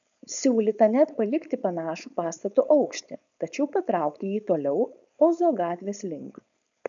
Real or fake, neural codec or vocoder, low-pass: fake; codec, 16 kHz, 4.8 kbps, FACodec; 7.2 kHz